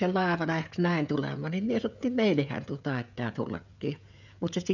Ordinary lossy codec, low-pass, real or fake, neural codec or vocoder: none; 7.2 kHz; fake; codec, 16 kHz, 16 kbps, FreqCodec, smaller model